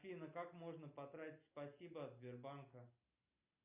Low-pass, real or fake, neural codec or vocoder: 3.6 kHz; real; none